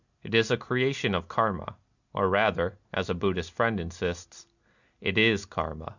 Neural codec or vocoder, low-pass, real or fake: none; 7.2 kHz; real